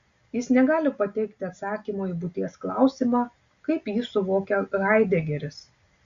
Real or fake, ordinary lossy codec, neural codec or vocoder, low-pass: real; Opus, 64 kbps; none; 7.2 kHz